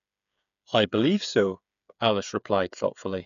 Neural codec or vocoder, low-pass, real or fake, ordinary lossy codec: codec, 16 kHz, 8 kbps, FreqCodec, smaller model; 7.2 kHz; fake; none